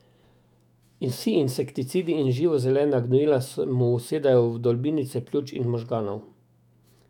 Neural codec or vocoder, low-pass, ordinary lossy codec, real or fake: autoencoder, 48 kHz, 128 numbers a frame, DAC-VAE, trained on Japanese speech; 19.8 kHz; none; fake